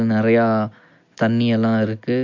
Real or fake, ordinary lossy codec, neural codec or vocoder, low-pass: real; MP3, 48 kbps; none; 7.2 kHz